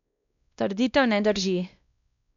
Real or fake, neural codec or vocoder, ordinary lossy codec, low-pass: fake; codec, 16 kHz, 1 kbps, X-Codec, WavLM features, trained on Multilingual LibriSpeech; none; 7.2 kHz